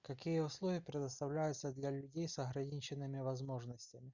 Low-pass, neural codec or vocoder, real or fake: 7.2 kHz; none; real